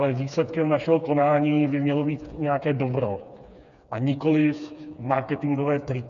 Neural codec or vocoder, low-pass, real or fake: codec, 16 kHz, 4 kbps, FreqCodec, smaller model; 7.2 kHz; fake